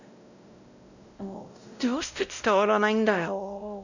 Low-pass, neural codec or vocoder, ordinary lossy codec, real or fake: 7.2 kHz; codec, 16 kHz, 0.5 kbps, X-Codec, WavLM features, trained on Multilingual LibriSpeech; none; fake